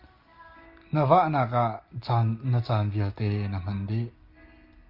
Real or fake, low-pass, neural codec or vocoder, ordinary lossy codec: real; 5.4 kHz; none; Opus, 24 kbps